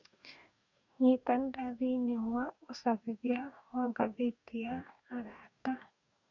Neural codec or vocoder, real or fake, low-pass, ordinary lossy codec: codec, 44.1 kHz, 2.6 kbps, DAC; fake; 7.2 kHz; none